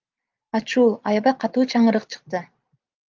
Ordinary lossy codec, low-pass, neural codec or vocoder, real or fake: Opus, 24 kbps; 7.2 kHz; vocoder, 44.1 kHz, 128 mel bands every 512 samples, BigVGAN v2; fake